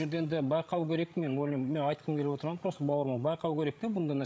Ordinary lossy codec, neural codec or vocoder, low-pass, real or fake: none; codec, 16 kHz, 16 kbps, FreqCodec, larger model; none; fake